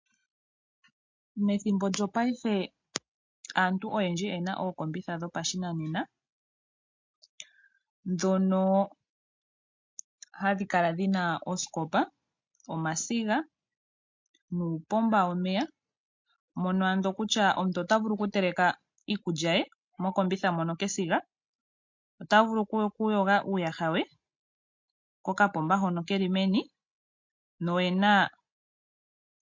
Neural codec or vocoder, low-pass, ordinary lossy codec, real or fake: none; 7.2 kHz; MP3, 48 kbps; real